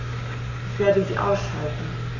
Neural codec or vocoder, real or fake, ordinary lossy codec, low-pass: codec, 44.1 kHz, 7.8 kbps, Pupu-Codec; fake; none; 7.2 kHz